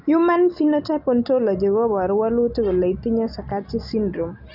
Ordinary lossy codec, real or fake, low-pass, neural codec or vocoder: none; real; 5.4 kHz; none